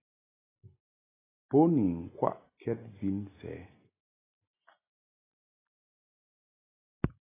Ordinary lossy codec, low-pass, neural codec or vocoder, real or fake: AAC, 16 kbps; 3.6 kHz; none; real